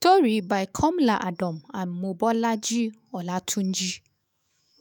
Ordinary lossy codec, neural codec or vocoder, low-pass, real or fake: none; autoencoder, 48 kHz, 128 numbers a frame, DAC-VAE, trained on Japanese speech; none; fake